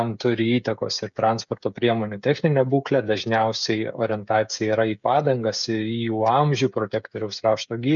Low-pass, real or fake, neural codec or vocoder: 7.2 kHz; fake; codec, 16 kHz, 16 kbps, FreqCodec, smaller model